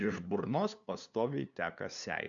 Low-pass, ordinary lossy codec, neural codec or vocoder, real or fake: 7.2 kHz; MP3, 64 kbps; codec, 16 kHz, 2 kbps, FunCodec, trained on LibriTTS, 25 frames a second; fake